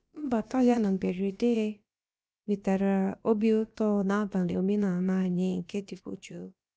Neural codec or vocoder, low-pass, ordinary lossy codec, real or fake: codec, 16 kHz, about 1 kbps, DyCAST, with the encoder's durations; none; none; fake